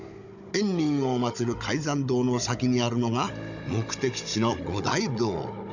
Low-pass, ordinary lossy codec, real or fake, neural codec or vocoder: 7.2 kHz; none; fake; codec, 16 kHz, 16 kbps, FunCodec, trained on Chinese and English, 50 frames a second